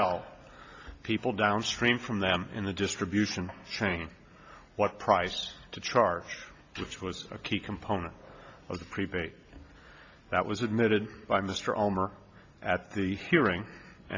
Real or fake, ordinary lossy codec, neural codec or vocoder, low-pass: real; AAC, 48 kbps; none; 7.2 kHz